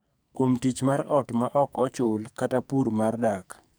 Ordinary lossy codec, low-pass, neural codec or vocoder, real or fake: none; none; codec, 44.1 kHz, 2.6 kbps, SNAC; fake